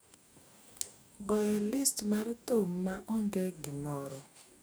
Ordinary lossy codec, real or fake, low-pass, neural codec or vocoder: none; fake; none; codec, 44.1 kHz, 2.6 kbps, DAC